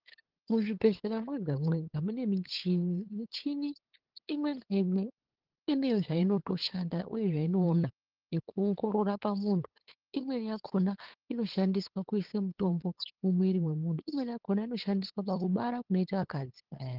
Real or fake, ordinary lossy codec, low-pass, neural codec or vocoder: fake; Opus, 16 kbps; 5.4 kHz; codec, 16 kHz, 8 kbps, FunCodec, trained on LibriTTS, 25 frames a second